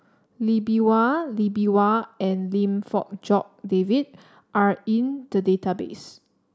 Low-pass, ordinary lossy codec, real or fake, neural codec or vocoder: none; none; real; none